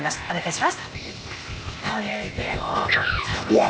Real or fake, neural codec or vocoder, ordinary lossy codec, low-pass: fake; codec, 16 kHz, 0.8 kbps, ZipCodec; none; none